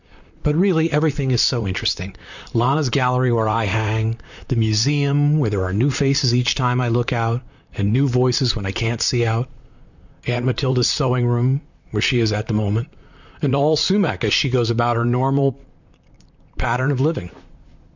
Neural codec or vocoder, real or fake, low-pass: vocoder, 44.1 kHz, 128 mel bands, Pupu-Vocoder; fake; 7.2 kHz